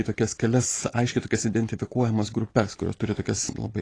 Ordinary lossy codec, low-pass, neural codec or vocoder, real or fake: AAC, 32 kbps; 9.9 kHz; none; real